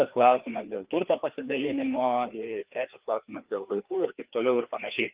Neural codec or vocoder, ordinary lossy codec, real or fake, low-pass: codec, 16 kHz, 2 kbps, FreqCodec, larger model; Opus, 32 kbps; fake; 3.6 kHz